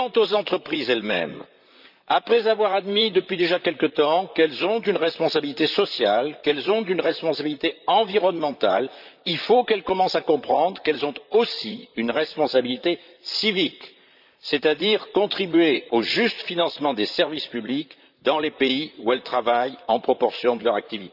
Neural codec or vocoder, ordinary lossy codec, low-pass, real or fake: vocoder, 44.1 kHz, 128 mel bands, Pupu-Vocoder; none; 5.4 kHz; fake